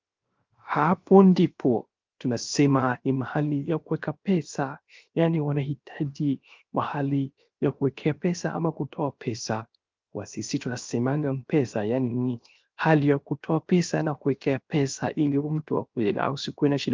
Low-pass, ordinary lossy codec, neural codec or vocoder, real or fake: 7.2 kHz; Opus, 24 kbps; codec, 16 kHz, 0.7 kbps, FocalCodec; fake